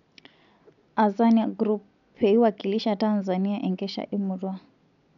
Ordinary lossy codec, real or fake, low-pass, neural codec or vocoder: none; real; 7.2 kHz; none